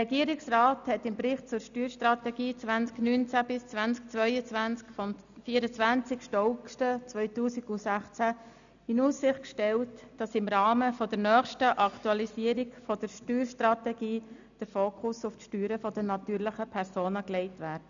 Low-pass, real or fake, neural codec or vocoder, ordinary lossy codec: 7.2 kHz; real; none; none